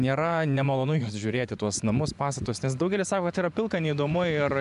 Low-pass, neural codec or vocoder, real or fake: 10.8 kHz; vocoder, 24 kHz, 100 mel bands, Vocos; fake